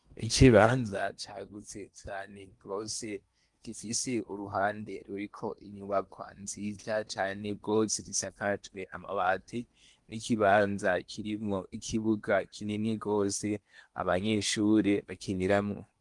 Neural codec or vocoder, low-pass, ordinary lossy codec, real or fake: codec, 16 kHz in and 24 kHz out, 0.8 kbps, FocalCodec, streaming, 65536 codes; 10.8 kHz; Opus, 32 kbps; fake